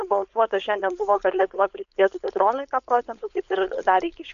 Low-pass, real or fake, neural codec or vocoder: 7.2 kHz; fake; codec, 16 kHz, 4.8 kbps, FACodec